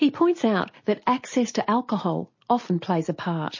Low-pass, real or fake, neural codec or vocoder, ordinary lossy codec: 7.2 kHz; real; none; MP3, 32 kbps